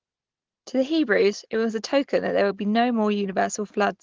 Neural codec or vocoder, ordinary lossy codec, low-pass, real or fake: none; Opus, 16 kbps; 7.2 kHz; real